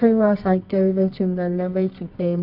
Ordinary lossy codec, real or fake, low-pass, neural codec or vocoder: AAC, 48 kbps; fake; 5.4 kHz; codec, 24 kHz, 0.9 kbps, WavTokenizer, medium music audio release